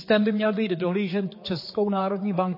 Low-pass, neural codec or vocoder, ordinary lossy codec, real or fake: 5.4 kHz; codec, 16 kHz, 4 kbps, X-Codec, HuBERT features, trained on general audio; MP3, 24 kbps; fake